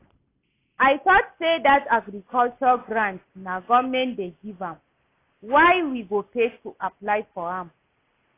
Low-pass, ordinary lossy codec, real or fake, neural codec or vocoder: 3.6 kHz; AAC, 24 kbps; real; none